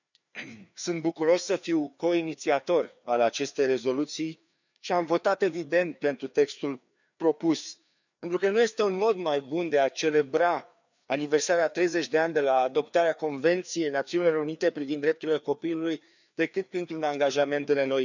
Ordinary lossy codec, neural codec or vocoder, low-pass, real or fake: none; codec, 16 kHz, 2 kbps, FreqCodec, larger model; 7.2 kHz; fake